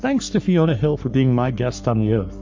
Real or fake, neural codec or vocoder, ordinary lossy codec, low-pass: fake; codec, 44.1 kHz, 3.4 kbps, Pupu-Codec; MP3, 48 kbps; 7.2 kHz